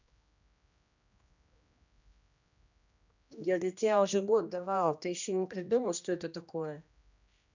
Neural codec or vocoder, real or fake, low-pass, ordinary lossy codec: codec, 16 kHz, 1 kbps, X-Codec, HuBERT features, trained on general audio; fake; 7.2 kHz; none